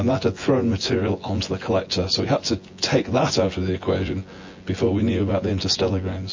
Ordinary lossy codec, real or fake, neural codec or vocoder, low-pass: MP3, 32 kbps; fake; vocoder, 24 kHz, 100 mel bands, Vocos; 7.2 kHz